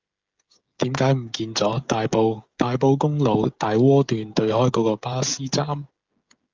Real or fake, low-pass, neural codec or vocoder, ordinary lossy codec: fake; 7.2 kHz; codec, 16 kHz, 16 kbps, FreqCodec, smaller model; Opus, 32 kbps